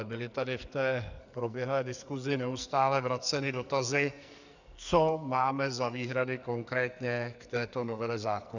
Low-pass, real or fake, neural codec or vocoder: 7.2 kHz; fake; codec, 44.1 kHz, 2.6 kbps, SNAC